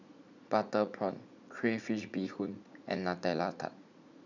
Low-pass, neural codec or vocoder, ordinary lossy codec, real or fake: 7.2 kHz; vocoder, 44.1 kHz, 80 mel bands, Vocos; none; fake